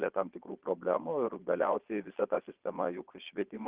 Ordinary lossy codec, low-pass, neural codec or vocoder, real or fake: Opus, 16 kbps; 3.6 kHz; vocoder, 22.05 kHz, 80 mel bands, Vocos; fake